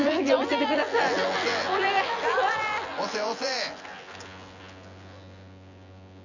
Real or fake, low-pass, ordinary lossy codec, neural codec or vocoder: fake; 7.2 kHz; none; vocoder, 24 kHz, 100 mel bands, Vocos